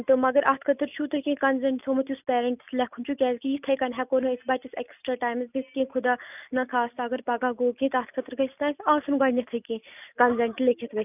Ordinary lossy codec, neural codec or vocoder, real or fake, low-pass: none; none; real; 3.6 kHz